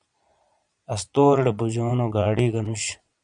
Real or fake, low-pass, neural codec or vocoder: fake; 9.9 kHz; vocoder, 22.05 kHz, 80 mel bands, Vocos